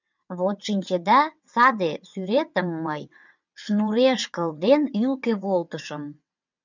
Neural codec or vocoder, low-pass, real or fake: vocoder, 22.05 kHz, 80 mel bands, WaveNeXt; 7.2 kHz; fake